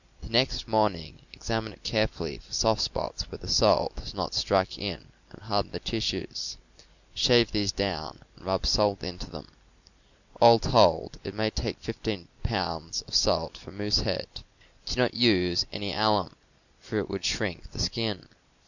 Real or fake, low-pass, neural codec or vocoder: real; 7.2 kHz; none